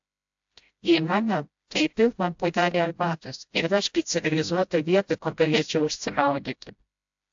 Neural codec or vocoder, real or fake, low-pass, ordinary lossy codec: codec, 16 kHz, 0.5 kbps, FreqCodec, smaller model; fake; 7.2 kHz; AAC, 64 kbps